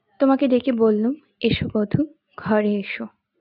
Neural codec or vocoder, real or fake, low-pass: none; real; 5.4 kHz